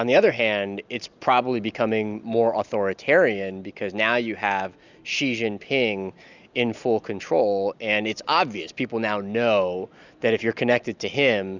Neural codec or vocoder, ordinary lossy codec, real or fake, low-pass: none; Opus, 64 kbps; real; 7.2 kHz